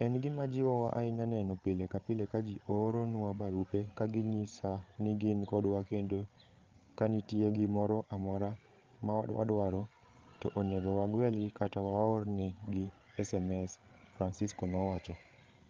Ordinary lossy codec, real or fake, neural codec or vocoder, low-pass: Opus, 32 kbps; fake; codec, 16 kHz, 8 kbps, FreqCodec, larger model; 7.2 kHz